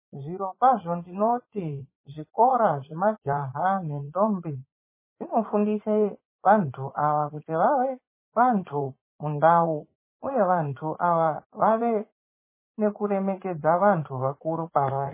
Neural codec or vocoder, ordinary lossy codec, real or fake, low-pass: vocoder, 44.1 kHz, 80 mel bands, Vocos; MP3, 16 kbps; fake; 3.6 kHz